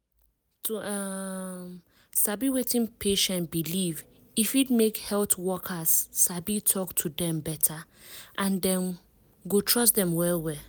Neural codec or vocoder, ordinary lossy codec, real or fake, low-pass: none; none; real; none